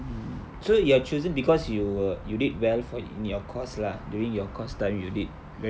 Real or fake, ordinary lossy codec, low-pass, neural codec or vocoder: real; none; none; none